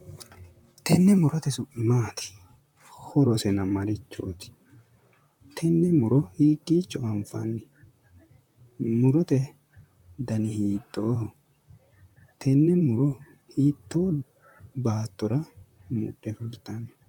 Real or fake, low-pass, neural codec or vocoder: fake; 19.8 kHz; vocoder, 44.1 kHz, 128 mel bands, Pupu-Vocoder